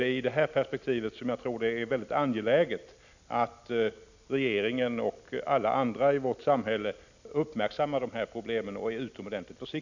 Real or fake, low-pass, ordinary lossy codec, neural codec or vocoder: real; 7.2 kHz; none; none